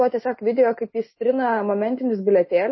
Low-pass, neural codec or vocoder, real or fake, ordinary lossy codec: 7.2 kHz; none; real; MP3, 24 kbps